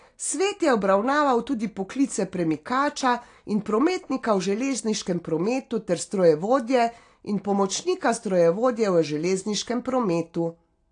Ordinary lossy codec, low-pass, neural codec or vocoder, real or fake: AAC, 48 kbps; 9.9 kHz; none; real